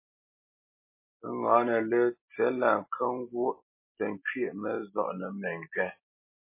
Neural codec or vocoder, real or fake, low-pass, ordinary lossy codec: none; real; 3.6 kHz; MP3, 24 kbps